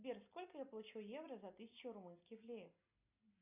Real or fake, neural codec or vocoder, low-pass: real; none; 3.6 kHz